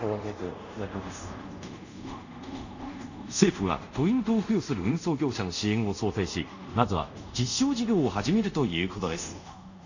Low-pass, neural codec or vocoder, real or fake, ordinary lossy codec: 7.2 kHz; codec, 24 kHz, 0.5 kbps, DualCodec; fake; none